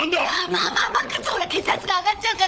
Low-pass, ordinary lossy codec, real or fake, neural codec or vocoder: none; none; fake; codec, 16 kHz, 16 kbps, FunCodec, trained on LibriTTS, 50 frames a second